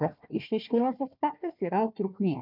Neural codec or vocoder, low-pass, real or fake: codec, 16 kHz, 1 kbps, FunCodec, trained on Chinese and English, 50 frames a second; 5.4 kHz; fake